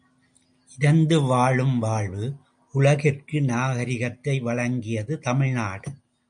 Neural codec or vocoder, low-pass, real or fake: none; 9.9 kHz; real